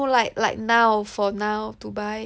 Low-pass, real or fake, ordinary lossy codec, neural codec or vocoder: none; real; none; none